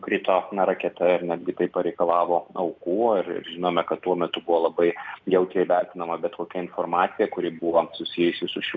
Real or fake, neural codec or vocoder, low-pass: real; none; 7.2 kHz